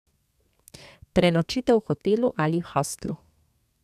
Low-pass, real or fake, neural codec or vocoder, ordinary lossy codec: 14.4 kHz; fake; codec, 32 kHz, 1.9 kbps, SNAC; none